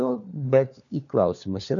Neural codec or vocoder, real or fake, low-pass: codec, 16 kHz, 4 kbps, FunCodec, trained on LibriTTS, 50 frames a second; fake; 7.2 kHz